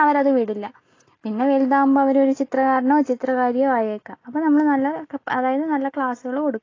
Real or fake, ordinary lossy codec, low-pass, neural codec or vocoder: real; AAC, 32 kbps; 7.2 kHz; none